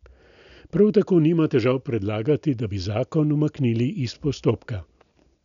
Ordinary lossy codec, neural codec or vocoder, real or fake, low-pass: none; none; real; 7.2 kHz